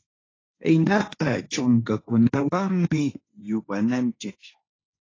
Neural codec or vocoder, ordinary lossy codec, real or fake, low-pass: codec, 16 kHz, 1.1 kbps, Voila-Tokenizer; AAC, 32 kbps; fake; 7.2 kHz